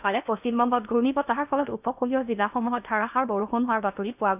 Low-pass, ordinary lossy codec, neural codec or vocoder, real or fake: 3.6 kHz; none; codec, 16 kHz in and 24 kHz out, 0.8 kbps, FocalCodec, streaming, 65536 codes; fake